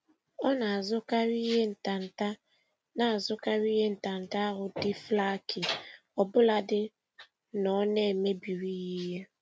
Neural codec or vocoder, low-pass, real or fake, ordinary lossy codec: none; none; real; none